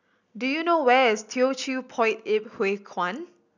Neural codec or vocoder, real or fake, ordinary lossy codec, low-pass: none; real; none; 7.2 kHz